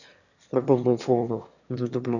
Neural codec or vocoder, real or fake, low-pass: autoencoder, 22.05 kHz, a latent of 192 numbers a frame, VITS, trained on one speaker; fake; 7.2 kHz